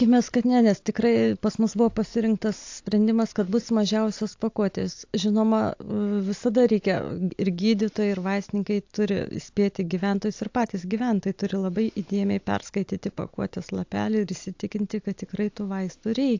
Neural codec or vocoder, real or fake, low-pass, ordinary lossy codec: none; real; 7.2 kHz; AAC, 48 kbps